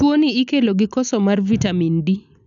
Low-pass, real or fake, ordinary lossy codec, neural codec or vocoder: 7.2 kHz; real; none; none